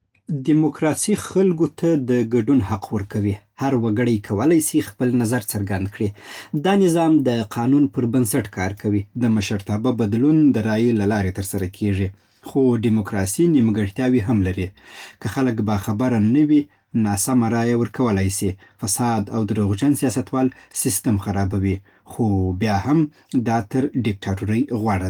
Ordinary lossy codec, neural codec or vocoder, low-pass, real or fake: Opus, 24 kbps; none; 19.8 kHz; real